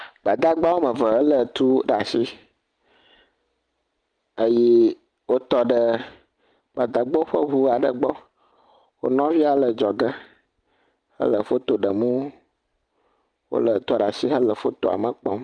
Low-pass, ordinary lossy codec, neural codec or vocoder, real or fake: 9.9 kHz; Opus, 32 kbps; none; real